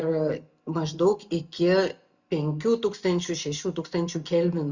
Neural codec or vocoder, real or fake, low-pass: none; real; 7.2 kHz